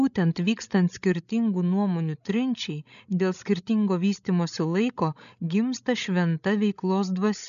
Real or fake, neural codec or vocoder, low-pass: fake; codec, 16 kHz, 16 kbps, FreqCodec, larger model; 7.2 kHz